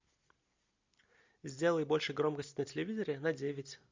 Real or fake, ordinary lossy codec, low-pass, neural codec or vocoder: real; MP3, 64 kbps; 7.2 kHz; none